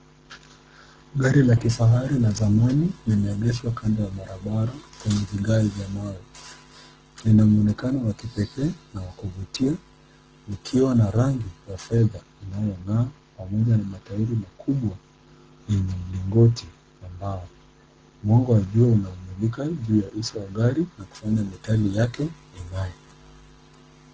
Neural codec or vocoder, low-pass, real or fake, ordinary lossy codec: codec, 44.1 kHz, 7.8 kbps, Pupu-Codec; 7.2 kHz; fake; Opus, 16 kbps